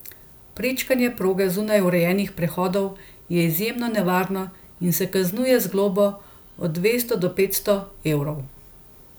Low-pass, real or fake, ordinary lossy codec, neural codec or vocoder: none; real; none; none